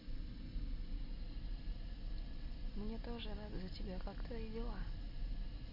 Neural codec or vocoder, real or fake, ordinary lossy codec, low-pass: none; real; MP3, 32 kbps; 5.4 kHz